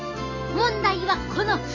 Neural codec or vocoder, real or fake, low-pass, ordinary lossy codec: none; real; 7.2 kHz; none